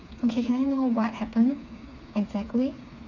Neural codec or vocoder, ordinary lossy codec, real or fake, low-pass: codec, 16 kHz, 4 kbps, FreqCodec, smaller model; none; fake; 7.2 kHz